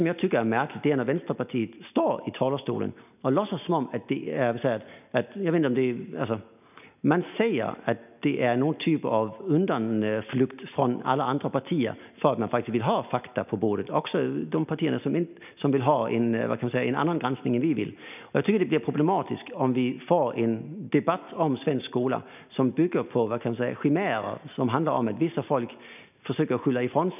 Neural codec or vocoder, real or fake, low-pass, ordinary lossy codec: none; real; 3.6 kHz; none